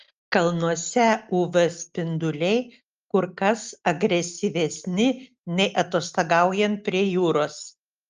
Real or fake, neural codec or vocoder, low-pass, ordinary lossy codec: real; none; 7.2 kHz; Opus, 32 kbps